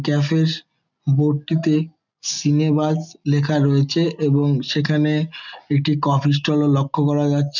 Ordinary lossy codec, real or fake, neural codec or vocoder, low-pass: none; real; none; 7.2 kHz